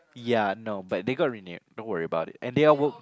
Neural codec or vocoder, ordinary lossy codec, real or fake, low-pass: none; none; real; none